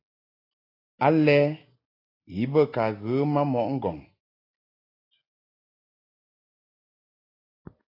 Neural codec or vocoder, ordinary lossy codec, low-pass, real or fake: none; AAC, 24 kbps; 5.4 kHz; real